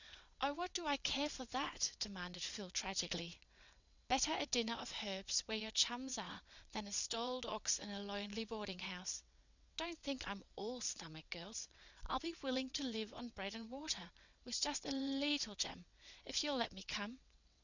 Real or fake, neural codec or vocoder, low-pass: fake; vocoder, 22.05 kHz, 80 mel bands, WaveNeXt; 7.2 kHz